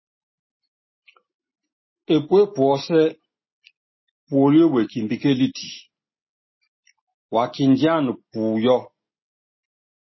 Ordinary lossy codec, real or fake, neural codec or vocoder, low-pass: MP3, 24 kbps; real; none; 7.2 kHz